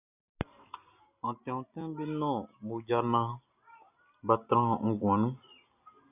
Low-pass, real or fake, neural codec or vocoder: 3.6 kHz; real; none